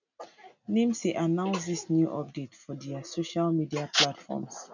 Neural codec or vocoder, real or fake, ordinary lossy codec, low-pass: none; real; none; 7.2 kHz